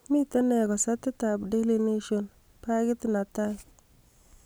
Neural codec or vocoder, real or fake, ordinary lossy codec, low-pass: none; real; none; none